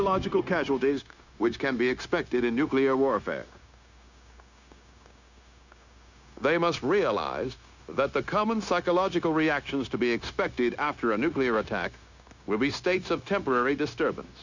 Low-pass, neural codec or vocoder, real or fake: 7.2 kHz; codec, 16 kHz, 0.9 kbps, LongCat-Audio-Codec; fake